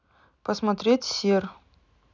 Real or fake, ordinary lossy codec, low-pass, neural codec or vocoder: real; none; 7.2 kHz; none